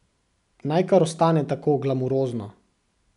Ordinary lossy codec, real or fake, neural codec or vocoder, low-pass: none; real; none; 10.8 kHz